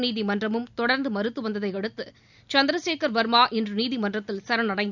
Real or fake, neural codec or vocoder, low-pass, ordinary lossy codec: real; none; 7.2 kHz; none